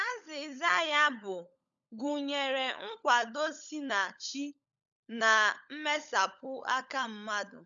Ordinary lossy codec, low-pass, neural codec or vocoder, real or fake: none; 7.2 kHz; codec, 16 kHz, 16 kbps, FunCodec, trained on LibriTTS, 50 frames a second; fake